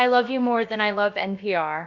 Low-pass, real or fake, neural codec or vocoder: 7.2 kHz; fake; codec, 16 kHz, about 1 kbps, DyCAST, with the encoder's durations